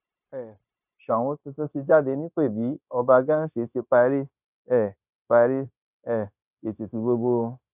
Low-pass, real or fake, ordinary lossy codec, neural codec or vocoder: 3.6 kHz; fake; none; codec, 16 kHz, 0.9 kbps, LongCat-Audio-Codec